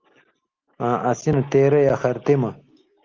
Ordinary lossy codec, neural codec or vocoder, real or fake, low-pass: Opus, 32 kbps; none; real; 7.2 kHz